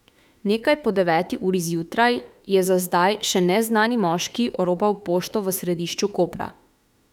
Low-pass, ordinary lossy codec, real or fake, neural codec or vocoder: 19.8 kHz; none; fake; autoencoder, 48 kHz, 32 numbers a frame, DAC-VAE, trained on Japanese speech